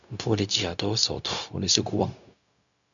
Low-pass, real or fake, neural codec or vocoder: 7.2 kHz; fake; codec, 16 kHz, 0.4 kbps, LongCat-Audio-Codec